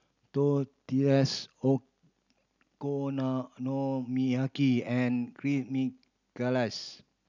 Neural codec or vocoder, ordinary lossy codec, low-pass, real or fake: none; none; 7.2 kHz; real